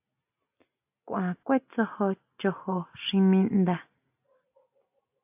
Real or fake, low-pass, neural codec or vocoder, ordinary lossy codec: real; 3.6 kHz; none; AAC, 32 kbps